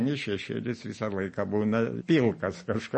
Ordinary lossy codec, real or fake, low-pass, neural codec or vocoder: MP3, 32 kbps; real; 10.8 kHz; none